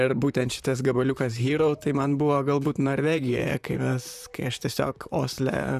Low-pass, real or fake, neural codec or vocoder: 14.4 kHz; fake; vocoder, 44.1 kHz, 128 mel bands, Pupu-Vocoder